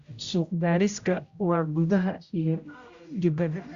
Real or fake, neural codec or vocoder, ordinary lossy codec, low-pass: fake; codec, 16 kHz, 0.5 kbps, X-Codec, HuBERT features, trained on general audio; Opus, 64 kbps; 7.2 kHz